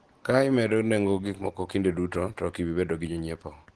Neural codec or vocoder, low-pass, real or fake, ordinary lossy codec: none; 10.8 kHz; real; Opus, 16 kbps